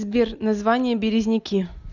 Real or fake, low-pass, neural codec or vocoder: real; 7.2 kHz; none